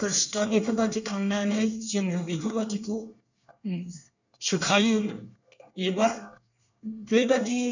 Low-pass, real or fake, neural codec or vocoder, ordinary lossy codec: 7.2 kHz; fake; codec, 24 kHz, 1 kbps, SNAC; none